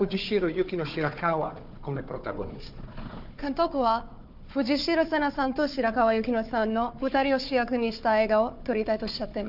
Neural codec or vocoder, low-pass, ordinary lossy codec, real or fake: codec, 16 kHz, 4 kbps, FunCodec, trained on Chinese and English, 50 frames a second; 5.4 kHz; none; fake